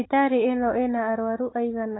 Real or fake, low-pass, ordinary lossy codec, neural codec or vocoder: real; 7.2 kHz; AAC, 16 kbps; none